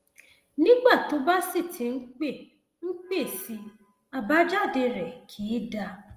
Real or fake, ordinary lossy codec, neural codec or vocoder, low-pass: real; Opus, 24 kbps; none; 14.4 kHz